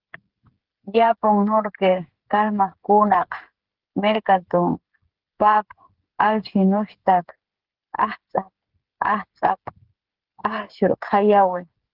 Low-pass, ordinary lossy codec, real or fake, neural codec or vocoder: 5.4 kHz; Opus, 16 kbps; fake; codec, 16 kHz, 8 kbps, FreqCodec, smaller model